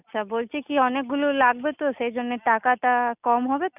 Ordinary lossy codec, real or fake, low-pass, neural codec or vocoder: none; real; 3.6 kHz; none